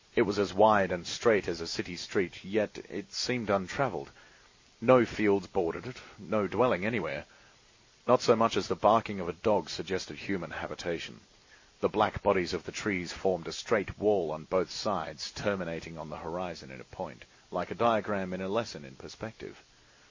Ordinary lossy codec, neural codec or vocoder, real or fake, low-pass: MP3, 32 kbps; none; real; 7.2 kHz